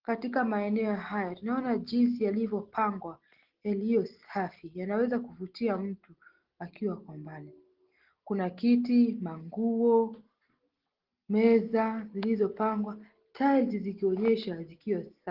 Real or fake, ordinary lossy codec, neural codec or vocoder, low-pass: real; Opus, 16 kbps; none; 5.4 kHz